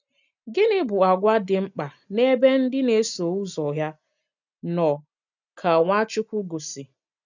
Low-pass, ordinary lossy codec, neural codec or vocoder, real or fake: 7.2 kHz; none; none; real